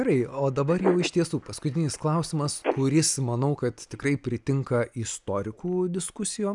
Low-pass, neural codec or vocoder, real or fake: 10.8 kHz; none; real